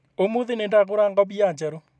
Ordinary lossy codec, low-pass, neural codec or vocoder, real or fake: none; none; none; real